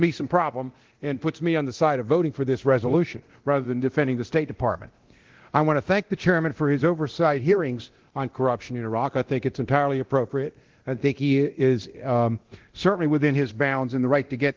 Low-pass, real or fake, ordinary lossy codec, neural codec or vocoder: 7.2 kHz; fake; Opus, 16 kbps; codec, 24 kHz, 0.9 kbps, DualCodec